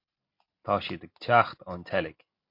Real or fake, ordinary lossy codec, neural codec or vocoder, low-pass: real; MP3, 32 kbps; none; 5.4 kHz